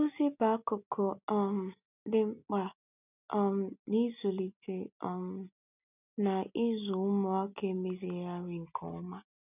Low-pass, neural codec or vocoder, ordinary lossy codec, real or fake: 3.6 kHz; none; none; real